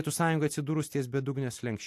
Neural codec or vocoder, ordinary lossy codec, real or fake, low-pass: none; AAC, 64 kbps; real; 14.4 kHz